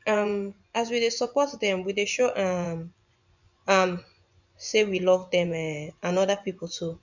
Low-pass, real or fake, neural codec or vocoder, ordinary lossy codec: 7.2 kHz; fake; vocoder, 24 kHz, 100 mel bands, Vocos; none